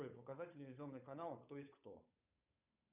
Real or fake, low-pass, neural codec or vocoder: fake; 3.6 kHz; codec, 16 kHz, 8 kbps, FunCodec, trained on Chinese and English, 25 frames a second